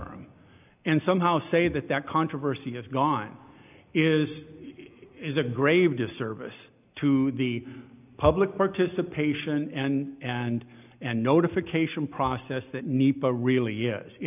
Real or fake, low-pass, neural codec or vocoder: real; 3.6 kHz; none